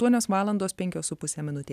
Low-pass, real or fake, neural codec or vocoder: 14.4 kHz; real; none